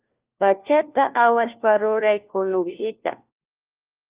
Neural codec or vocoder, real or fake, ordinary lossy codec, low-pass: codec, 16 kHz, 1 kbps, FunCodec, trained on LibriTTS, 50 frames a second; fake; Opus, 32 kbps; 3.6 kHz